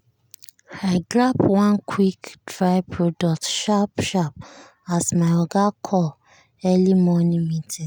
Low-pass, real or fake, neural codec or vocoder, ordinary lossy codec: none; real; none; none